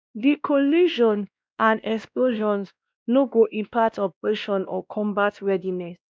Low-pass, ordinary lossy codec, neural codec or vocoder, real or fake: none; none; codec, 16 kHz, 1 kbps, X-Codec, WavLM features, trained on Multilingual LibriSpeech; fake